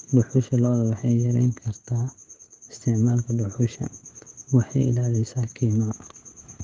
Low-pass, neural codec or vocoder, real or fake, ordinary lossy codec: 7.2 kHz; codec, 16 kHz, 16 kbps, FreqCodec, smaller model; fake; Opus, 16 kbps